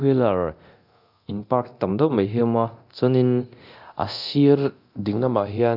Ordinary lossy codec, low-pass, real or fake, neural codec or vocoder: AAC, 48 kbps; 5.4 kHz; fake; codec, 24 kHz, 0.9 kbps, DualCodec